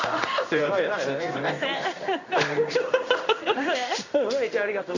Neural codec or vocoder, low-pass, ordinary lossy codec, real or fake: codec, 16 kHz in and 24 kHz out, 1 kbps, XY-Tokenizer; 7.2 kHz; none; fake